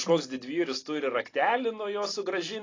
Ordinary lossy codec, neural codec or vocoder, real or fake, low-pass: AAC, 32 kbps; none; real; 7.2 kHz